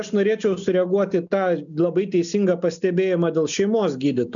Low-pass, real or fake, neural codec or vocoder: 7.2 kHz; real; none